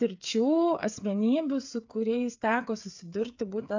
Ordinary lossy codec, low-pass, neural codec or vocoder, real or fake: AAC, 48 kbps; 7.2 kHz; codec, 16 kHz, 8 kbps, FreqCodec, smaller model; fake